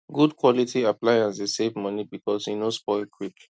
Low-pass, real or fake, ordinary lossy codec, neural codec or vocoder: none; real; none; none